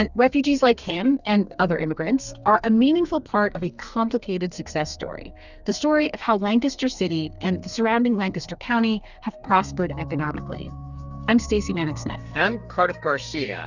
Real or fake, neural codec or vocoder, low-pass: fake; codec, 32 kHz, 1.9 kbps, SNAC; 7.2 kHz